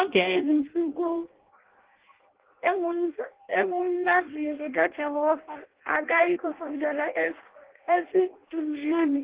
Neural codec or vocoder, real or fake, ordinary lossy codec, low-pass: codec, 16 kHz in and 24 kHz out, 0.6 kbps, FireRedTTS-2 codec; fake; Opus, 16 kbps; 3.6 kHz